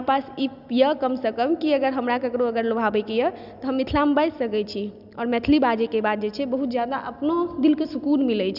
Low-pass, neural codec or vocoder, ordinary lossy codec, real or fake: 5.4 kHz; none; none; real